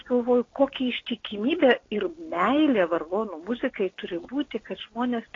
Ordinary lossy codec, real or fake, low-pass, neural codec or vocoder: AAC, 32 kbps; real; 7.2 kHz; none